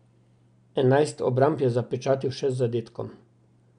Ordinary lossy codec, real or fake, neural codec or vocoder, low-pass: none; real; none; 9.9 kHz